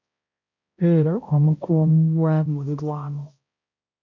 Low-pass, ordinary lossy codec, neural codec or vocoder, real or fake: 7.2 kHz; MP3, 64 kbps; codec, 16 kHz, 0.5 kbps, X-Codec, HuBERT features, trained on balanced general audio; fake